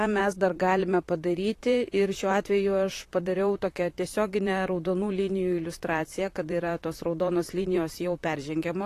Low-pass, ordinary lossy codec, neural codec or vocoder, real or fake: 14.4 kHz; AAC, 48 kbps; vocoder, 44.1 kHz, 128 mel bands, Pupu-Vocoder; fake